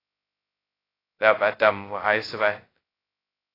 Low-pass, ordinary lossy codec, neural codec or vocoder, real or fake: 5.4 kHz; AAC, 24 kbps; codec, 16 kHz, 0.2 kbps, FocalCodec; fake